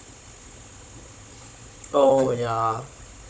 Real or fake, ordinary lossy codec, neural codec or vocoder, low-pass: fake; none; codec, 16 kHz, 16 kbps, FunCodec, trained on Chinese and English, 50 frames a second; none